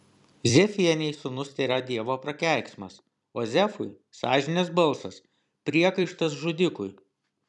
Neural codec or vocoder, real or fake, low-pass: vocoder, 44.1 kHz, 128 mel bands every 256 samples, BigVGAN v2; fake; 10.8 kHz